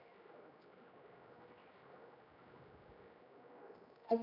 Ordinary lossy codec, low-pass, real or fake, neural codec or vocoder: none; 5.4 kHz; fake; codec, 16 kHz, 1 kbps, X-Codec, HuBERT features, trained on general audio